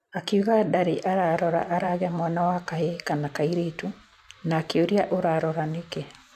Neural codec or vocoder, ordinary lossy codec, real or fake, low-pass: vocoder, 44.1 kHz, 128 mel bands every 512 samples, BigVGAN v2; none; fake; 14.4 kHz